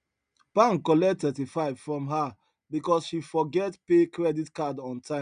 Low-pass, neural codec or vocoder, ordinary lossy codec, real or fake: 9.9 kHz; none; none; real